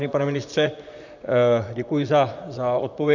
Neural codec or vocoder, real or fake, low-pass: vocoder, 44.1 kHz, 128 mel bands, Pupu-Vocoder; fake; 7.2 kHz